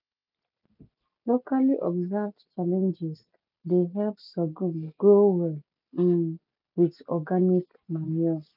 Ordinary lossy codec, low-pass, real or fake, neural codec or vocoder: none; 5.4 kHz; real; none